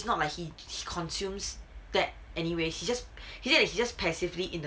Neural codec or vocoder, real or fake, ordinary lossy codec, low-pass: none; real; none; none